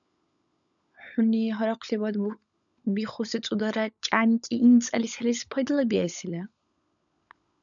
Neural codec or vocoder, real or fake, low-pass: codec, 16 kHz, 16 kbps, FunCodec, trained on LibriTTS, 50 frames a second; fake; 7.2 kHz